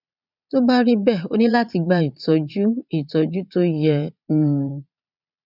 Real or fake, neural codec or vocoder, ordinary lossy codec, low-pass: fake; vocoder, 22.05 kHz, 80 mel bands, Vocos; none; 5.4 kHz